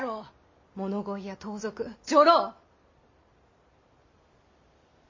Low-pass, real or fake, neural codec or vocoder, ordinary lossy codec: 7.2 kHz; real; none; MP3, 32 kbps